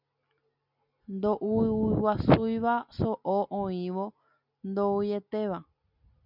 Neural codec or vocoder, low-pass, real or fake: none; 5.4 kHz; real